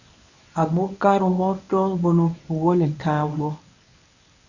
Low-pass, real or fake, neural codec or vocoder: 7.2 kHz; fake; codec, 24 kHz, 0.9 kbps, WavTokenizer, medium speech release version 1